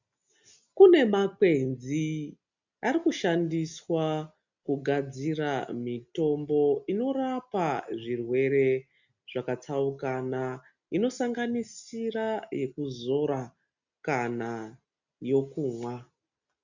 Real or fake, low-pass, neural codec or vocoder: real; 7.2 kHz; none